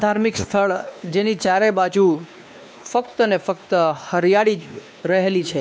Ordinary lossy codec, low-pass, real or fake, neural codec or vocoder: none; none; fake; codec, 16 kHz, 2 kbps, X-Codec, WavLM features, trained on Multilingual LibriSpeech